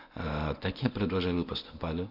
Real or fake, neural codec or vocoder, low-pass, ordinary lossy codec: fake; codec, 24 kHz, 0.9 kbps, WavTokenizer, medium speech release version 1; 5.4 kHz; none